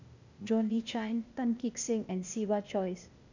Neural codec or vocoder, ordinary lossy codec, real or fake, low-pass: codec, 16 kHz, 0.8 kbps, ZipCodec; none; fake; 7.2 kHz